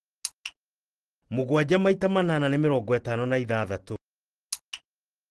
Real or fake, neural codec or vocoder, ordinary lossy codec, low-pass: real; none; Opus, 16 kbps; 10.8 kHz